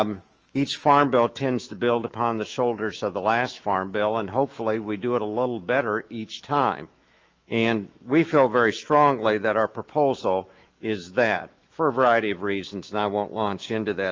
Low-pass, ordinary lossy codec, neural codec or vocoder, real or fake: 7.2 kHz; Opus, 24 kbps; autoencoder, 48 kHz, 128 numbers a frame, DAC-VAE, trained on Japanese speech; fake